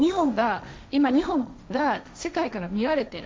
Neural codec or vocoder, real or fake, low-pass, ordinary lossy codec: codec, 16 kHz, 1.1 kbps, Voila-Tokenizer; fake; none; none